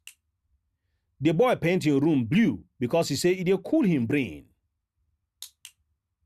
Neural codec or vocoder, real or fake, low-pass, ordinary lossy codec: none; real; 14.4 kHz; Opus, 64 kbps